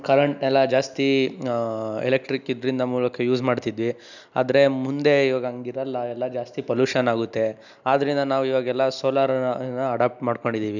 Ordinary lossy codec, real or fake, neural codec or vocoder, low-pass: none; real; none; 7.2 kHz